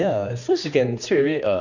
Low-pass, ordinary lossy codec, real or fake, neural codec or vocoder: 7.2 kHz; none; fake; codec, 16 kHz, 2 kbps, X-Codec, HuBERT features, trained on general audio